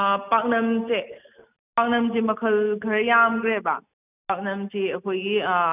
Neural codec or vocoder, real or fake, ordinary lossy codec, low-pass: none; real; none; 3.6 kHz